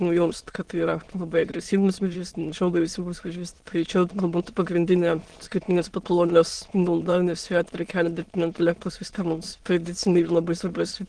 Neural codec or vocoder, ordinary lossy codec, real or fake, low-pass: autoencoder, 22.05 kHz, a latent of 192 numbers a frame, VITS, trained on many speakers; Opus, 16 kbps; fake; 9.9 kHz